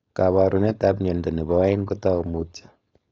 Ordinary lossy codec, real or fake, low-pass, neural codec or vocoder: AAC, 32 kbps; fake; 7.2 kHz; codec, 16 kHz, 4.8 kbps, FACodec